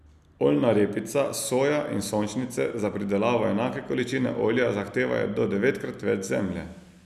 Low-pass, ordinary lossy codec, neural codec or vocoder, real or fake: 14.4 kHz; none; none; real